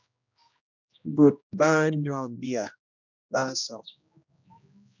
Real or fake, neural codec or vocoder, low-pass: fake; codec, 16 kHz, 1 kbps, X-Codec, HuBERT features, trained on general audio; 7.2 kHz